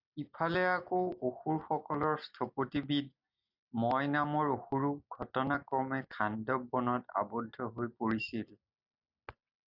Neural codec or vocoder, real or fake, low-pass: none; real; 5.4 kHz